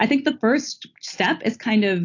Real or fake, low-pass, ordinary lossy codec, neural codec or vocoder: real; 7.2 kHz; AAC, 48 kbps; none